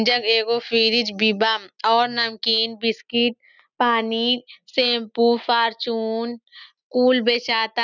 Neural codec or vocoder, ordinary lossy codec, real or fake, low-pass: none; none; real; 7.2 kHz